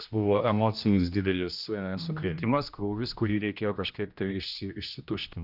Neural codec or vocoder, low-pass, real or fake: codec, 16 kHz, 1 kbps, X-Codec, HuBERT features, trained on general audio; 5.4 kHz; fake